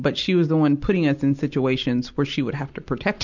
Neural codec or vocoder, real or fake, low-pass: none; real; 7.2 kHz